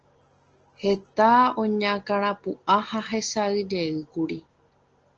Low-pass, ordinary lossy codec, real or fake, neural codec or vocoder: 7.2 kHz; Opus, 16 kbps; real; none